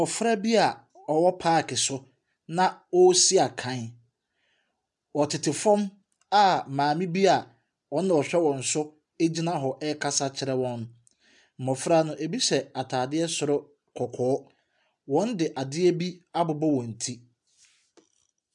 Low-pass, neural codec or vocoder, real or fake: 10.8 kHz; none; real